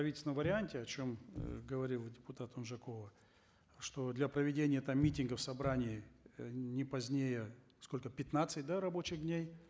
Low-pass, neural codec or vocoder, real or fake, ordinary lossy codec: none; none; real; none